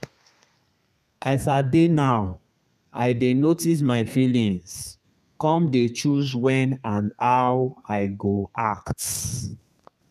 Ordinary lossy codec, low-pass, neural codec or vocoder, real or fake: none; 14.4 kHz; codec, 32 kHz, 1.9 kbps, SNAC; fake